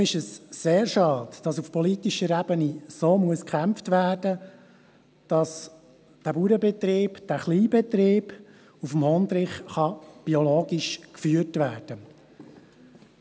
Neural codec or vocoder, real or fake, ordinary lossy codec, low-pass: none; real; none; none